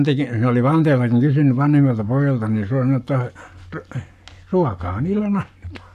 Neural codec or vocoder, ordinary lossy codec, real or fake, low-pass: codec, 44.1 kHz, 7.8 kbps, Pupu-Codec; none; fake; 14.4 kHz